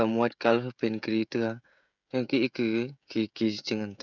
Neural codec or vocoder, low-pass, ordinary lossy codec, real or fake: codec, 16 kHz, 16 kbps, FreqCodec, smaller model; 7.2 kHz; none; fake